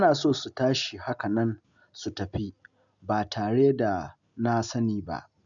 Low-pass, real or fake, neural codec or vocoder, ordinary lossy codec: 7.2 kHz; real; none; none